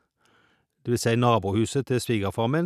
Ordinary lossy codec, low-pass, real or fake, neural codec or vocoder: none; 14.4 kHz; real; none